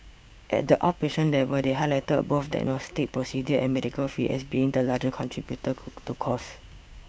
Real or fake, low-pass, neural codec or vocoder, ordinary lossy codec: fake; none; codec, 16 kHz, 6 kbps, DAC; none